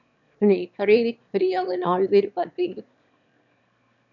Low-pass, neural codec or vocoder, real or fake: 7.2 kHz; autoencoder, 22.05 kHz, a latent of 192 numbers a frame, VITS, trained on one speaker; fake